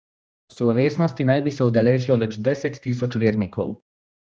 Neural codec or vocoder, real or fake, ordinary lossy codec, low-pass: codec, 16 kHz, 1 kbps, X-Codec, HuBERT features, trained on general audio; fake; none; none